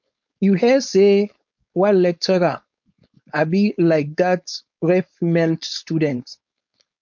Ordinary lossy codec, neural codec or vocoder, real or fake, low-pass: MP3, 48 kbps; codec, 16 kHz, 4.8 kbps, FACodec; fake; 7.2 kHz